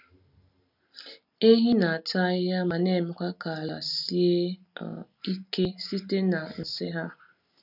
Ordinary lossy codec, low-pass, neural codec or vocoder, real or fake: none; 5.4 kHz; none; real